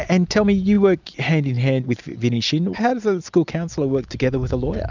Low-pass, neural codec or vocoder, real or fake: 7.2 kHz; none; real